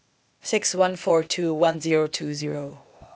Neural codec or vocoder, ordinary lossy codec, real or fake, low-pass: codec, 16 kHz, 0.8 kbps, ZipCodec; none; fake; none